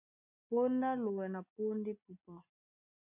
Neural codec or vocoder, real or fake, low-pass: none; real; 3.6 kHz